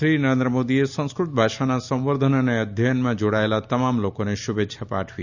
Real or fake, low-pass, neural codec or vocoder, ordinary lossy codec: real; 7.2 kHz; none; none